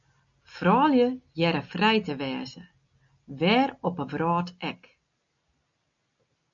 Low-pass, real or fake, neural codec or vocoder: 7.2 kHz; real; none